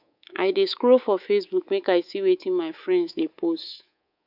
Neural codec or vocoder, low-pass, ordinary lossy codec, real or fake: codec, 24 kHz, 3.1 kbps, DualCodec; 5.4 kHz; none; fake